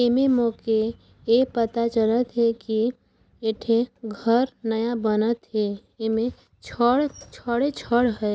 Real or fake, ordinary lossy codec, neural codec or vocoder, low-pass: real; none; none; none